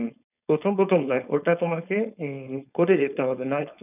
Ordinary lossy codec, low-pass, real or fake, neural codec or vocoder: none; 3.6 kHz; fake; codec, 16 kHz, 4.8 kbps, FACodec